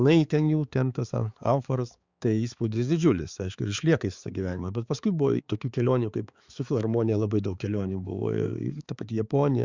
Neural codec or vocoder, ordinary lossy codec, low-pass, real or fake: codec, 16 kHz, 4 kbps, X-Codec, HuBERT features, trained on balanced general audio; Opus, 64 kbps; 7.2 kHz; fake